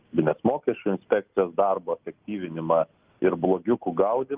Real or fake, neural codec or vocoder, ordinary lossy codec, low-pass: real; none; Opus, 24 kbps; 3.6 kHz